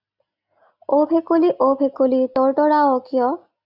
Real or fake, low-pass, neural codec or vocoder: real; 5.4 kHz; none